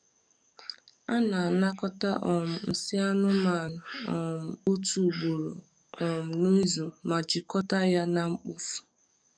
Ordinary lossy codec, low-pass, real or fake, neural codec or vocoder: none; 9.9 kHz; fake; codec, 44.1 kHz, 7.8 kbps, DAC